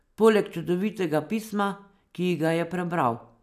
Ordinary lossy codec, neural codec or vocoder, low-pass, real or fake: none; none; 14.4 kHz; real